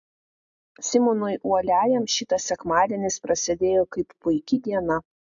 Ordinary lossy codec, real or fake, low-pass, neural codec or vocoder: AAC, 64 kbps; real; 7.2 kHz; none